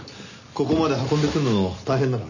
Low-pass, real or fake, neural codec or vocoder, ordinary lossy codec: 7.2 kHz; real; none; none